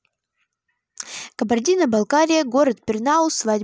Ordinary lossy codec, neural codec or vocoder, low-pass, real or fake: none; none; none; real